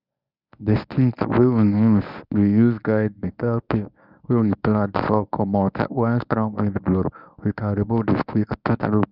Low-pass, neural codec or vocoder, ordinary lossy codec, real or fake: 5.4 kHz; codec, 24 kHz, 0.9 kbps, WavTokenizer, medium speech release version 1; none; fake